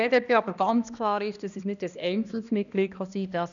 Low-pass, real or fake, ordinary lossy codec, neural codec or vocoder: 7.2 kHz; fake; none; codec, 16 kHz, 1 kbps, X-Codec, HuBERT features, trained on balanced general audio